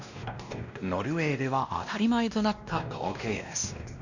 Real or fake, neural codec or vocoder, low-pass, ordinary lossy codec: fake; codec, 16 kHz, 1 kbps, X-Codec, WavLM features, trained on Multilingual LibriSpeech; 7.2 kHz; none